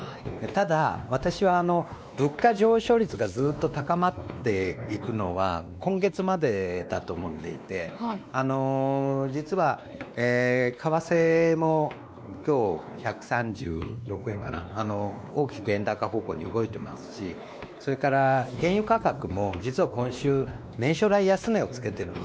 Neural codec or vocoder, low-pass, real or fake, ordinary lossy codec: codec, 16 kHz, 2 kbps, X-Codec, WavLM features, trained on Multilingual LibriSpeech; none; fake; none